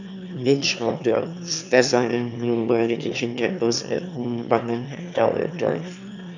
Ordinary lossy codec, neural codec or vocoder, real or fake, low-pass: none; autoencoder, 22.05 kHz, a latent of 192 numbers a frame, VITS, trained on one speaker; fake; 7.2 kHz